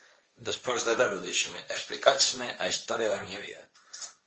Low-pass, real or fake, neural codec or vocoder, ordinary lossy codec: 7.2 kHz; fake; codec, 16 kHz, 1.1 kbps, Voila-Tokenizer; Opus, 24 kbps